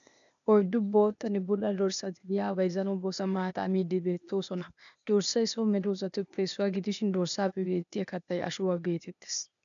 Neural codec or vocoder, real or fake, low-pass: codec, 16 kHz, 0.8 kbps, ZipCodec; fake; 7.2 kHz